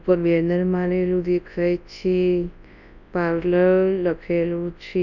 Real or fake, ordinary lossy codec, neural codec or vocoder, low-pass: fake; none; codec, 24 kHz, 0.9 kbps, WavTokenizer, large speech release; 7.2 kHz